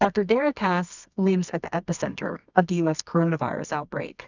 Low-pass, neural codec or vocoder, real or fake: 7.2 kHz; codec, 24 kHz, 0.9 kbps, WavTokenizer, medium music audio release; fake